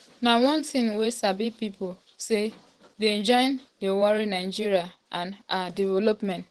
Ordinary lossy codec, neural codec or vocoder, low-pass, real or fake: Opus, 16 kbps; vocoder, 24 kHz, 100 mel bands, Vocos; 10.8 kHz; fake